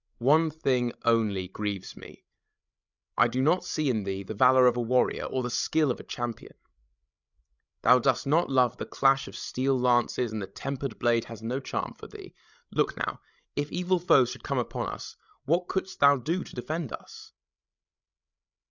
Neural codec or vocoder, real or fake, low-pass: codec, 16 kHz, 16 kbps, FreqCodec, larger model; fake; 7.2 kHz